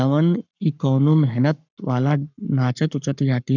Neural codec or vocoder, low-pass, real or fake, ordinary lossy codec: codec, 44.1 kHz, 7.8 kbps, Pupu-Codec; 7.2 kHz; fake; none